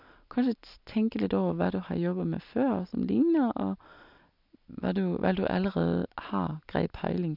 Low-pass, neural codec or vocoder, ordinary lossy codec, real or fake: 5.4 kHz; none; none; real